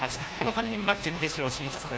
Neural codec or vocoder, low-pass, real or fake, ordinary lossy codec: codec, 16 kHz, 1 kbps, FunCodec, trained on LibriTTS, 50 frames a second; none; fake; none